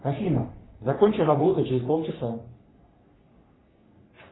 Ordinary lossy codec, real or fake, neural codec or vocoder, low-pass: AAC, 16 kbps; fake; codec, 44.1 kHz, 3.4 kbps, Pupu-Codec; 7.2 kHz